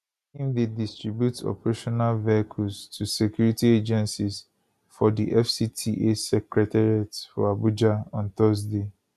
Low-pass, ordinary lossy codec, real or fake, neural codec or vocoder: 14.4 kHz; none; real; none